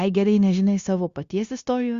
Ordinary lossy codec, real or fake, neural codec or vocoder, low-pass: Opus, 64 kbps; fake; codec, 16 kHz, 0.9 kbps, LongCat-Audio-Codec; 7.2 kHz